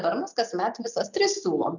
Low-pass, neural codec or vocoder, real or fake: 7.2 kHz; none; real